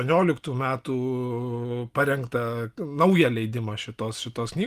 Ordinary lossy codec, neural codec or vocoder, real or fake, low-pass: Opus, 32 kbps; none; real; 14.4 kHz